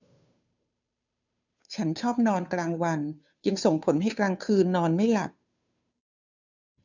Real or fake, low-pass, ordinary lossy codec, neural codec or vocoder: fake; 7.2 kHz; none; codec, 16 kHz, 2 kbps, FunCodec, trained on Chinese and English, 25 frames a second